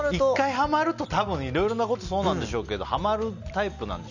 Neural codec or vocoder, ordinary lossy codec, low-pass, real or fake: none; none; 7.2 kHz; real